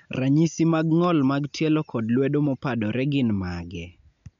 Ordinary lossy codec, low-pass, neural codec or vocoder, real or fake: none; 7.2 kHz; none; real